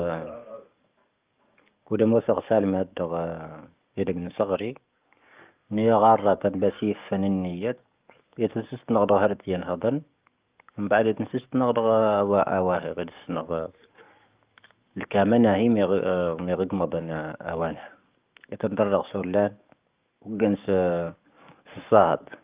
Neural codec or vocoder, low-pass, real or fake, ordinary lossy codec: codec, 44.1 kHz, 7.8 kbps, DAC; 3.6 kHz; fake; Opus, 24 kbps